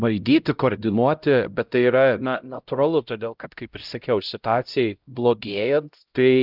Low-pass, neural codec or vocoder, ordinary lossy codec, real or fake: 5.4 kHz; codec, 16 kHz, 0.5 kbps, X-Codec, HuBERT features, trained on LibriSpeech; Opus, 24 kbps; fake